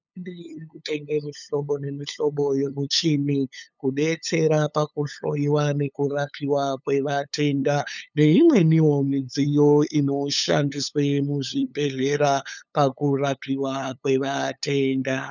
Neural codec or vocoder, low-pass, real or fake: codec, 16 kHz, 8 kbps, FunCodec, trained on LibriTTS, 25 frames a second; 7.2 kHz; fake